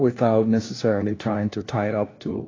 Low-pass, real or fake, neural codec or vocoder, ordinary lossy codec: 7.2 kHz; fake; codec, 16 kHz, 1 kbps, FunCodec, trained on LibriTTS, 50 frames a second; AAC, 32 kbps